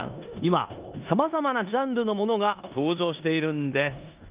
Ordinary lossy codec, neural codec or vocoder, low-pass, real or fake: Opus, 32 kbps; codec, 16 kHz in and 24 kHz out, 0.9 kbps, LongCat-Audio-Codec, four codebook decoder; 3.6 kHz; fake